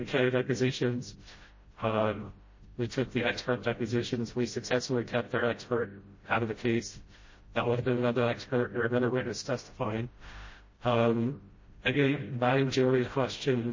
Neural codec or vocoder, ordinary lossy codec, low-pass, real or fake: codec, 16 kHz, 0.5 kbps, FreqCodec, smaller model; MP3, 32 kbps; 7.2 kHz; fake